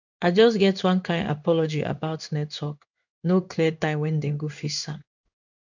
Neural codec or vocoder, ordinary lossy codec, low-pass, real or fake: codec, 16 kHz in and 24 kHz out, 1 kbps, XY-Tokenizer; none; 7.2 kHz; fake